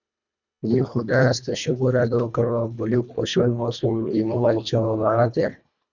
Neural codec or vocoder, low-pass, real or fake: codec, 24 kHz, 1.5 kbps, HILCodec; 7.2 kHz; fake